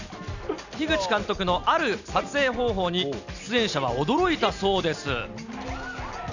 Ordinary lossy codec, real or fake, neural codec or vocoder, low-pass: none; real; none; 7.2 kHz